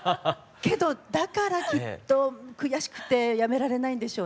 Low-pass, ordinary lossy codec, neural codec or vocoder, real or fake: none; none; none; real